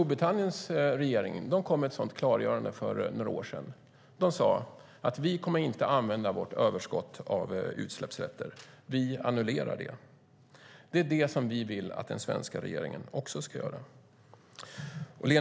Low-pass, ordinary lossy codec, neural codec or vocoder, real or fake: none; none; none; real